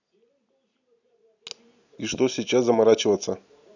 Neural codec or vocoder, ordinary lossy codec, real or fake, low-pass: none; none; real; 7.2 kHz